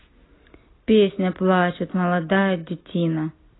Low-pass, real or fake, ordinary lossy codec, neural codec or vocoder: 7.2 kHz; real; AAC, 16 kbps; none